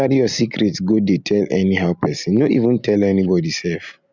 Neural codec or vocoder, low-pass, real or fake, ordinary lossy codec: none; 7.2 kHz; real; none